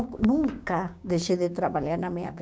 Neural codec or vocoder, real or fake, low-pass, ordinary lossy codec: codec, 16 kHz, 6 kbps, DAC; fake; none; none